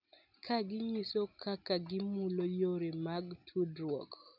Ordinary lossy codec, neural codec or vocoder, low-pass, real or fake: none; none; 5.4 kHz; real